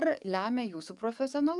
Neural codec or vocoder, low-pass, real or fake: codec, 44.1 kHz, 7.8 kbps, DAC; 10.8 kHz; fake